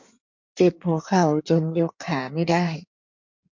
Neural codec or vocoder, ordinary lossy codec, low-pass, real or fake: codec, 16 kHz in and 24 kHz out, 1.1 kbps, FireRedTTS-2 codec; MP3, 48 kbps; 7.2 kHz; fake